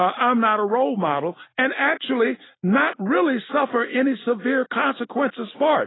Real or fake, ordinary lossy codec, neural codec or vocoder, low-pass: real; AAC, 16 kbps; none; 7.2 kHz